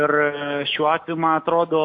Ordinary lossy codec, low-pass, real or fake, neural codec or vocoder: AAC, 48 kbps; 7.2 kHz; real; none